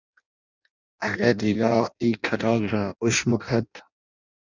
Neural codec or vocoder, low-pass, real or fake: codec, 16 kHz in and 24 kHz out, 0.6 kbps, FireRedTTS-2 codec; 7.2 kHz; fake